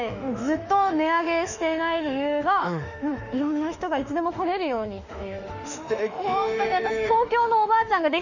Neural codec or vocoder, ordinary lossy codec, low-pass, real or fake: autoencoder, 48 kHz, 32 numbers a frame, DAC-VAE, trained on Japanese speech; none; 7.2 kHz; fake